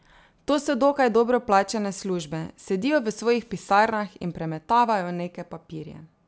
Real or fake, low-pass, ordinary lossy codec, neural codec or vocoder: real; none; none; none